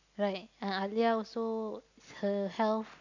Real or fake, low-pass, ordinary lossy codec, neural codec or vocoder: real; 7.2 kHz; none; none